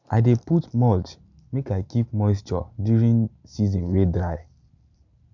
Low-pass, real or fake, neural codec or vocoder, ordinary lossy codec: 7.2 kHz; real; none; none